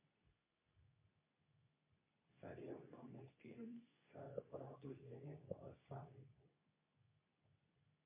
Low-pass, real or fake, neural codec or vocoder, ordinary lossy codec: 3.6 kHz; fake; codec, 24 kHz, 0.9 kbps, WavTokenizer, medium speech release version 2; MP3, 24 kbps